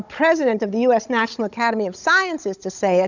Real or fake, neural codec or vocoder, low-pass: fake; codec, 16 kHz, 16 kbps, FunCodec, trained on Chinese and English, 50 frames a second; 7.2 kHz